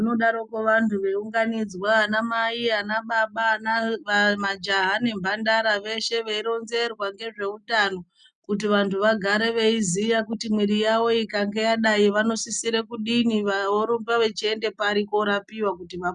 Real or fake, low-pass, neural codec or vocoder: real; 9.9 kHz; none